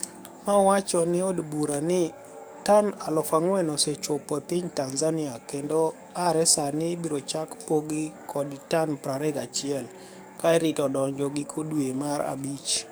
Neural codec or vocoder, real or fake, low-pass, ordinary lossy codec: codec, 44.1 kHz, 7.8 kbps, DAC; fake; none; none